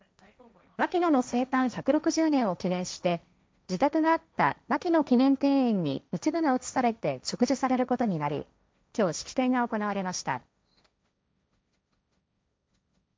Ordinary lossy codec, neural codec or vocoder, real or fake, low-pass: none; codec, 16 kHz, 1.1 kbps, Voila-Tokenizer; fake; none